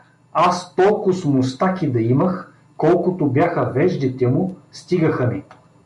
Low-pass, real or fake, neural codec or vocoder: 10.8 kHz; real; none